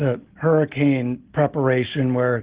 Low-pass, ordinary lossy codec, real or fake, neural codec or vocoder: 3.6 kHz; Opus, 16 kbps; real; none